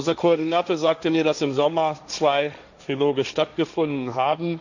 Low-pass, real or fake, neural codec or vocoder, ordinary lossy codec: 7.2 kHz; fake; codec, 16 kHz, 1.1 kbps, Voila-Tokenizer; none